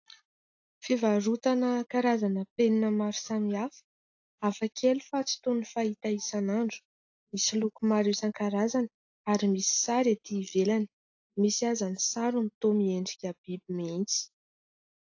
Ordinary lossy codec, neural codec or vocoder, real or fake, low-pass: AAC, 48 kbps; none; real; 7.2 kHz